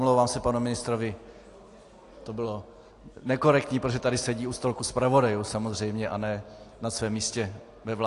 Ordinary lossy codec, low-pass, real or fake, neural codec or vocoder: AAC, 48 kbps; 10.8 kHz; real; none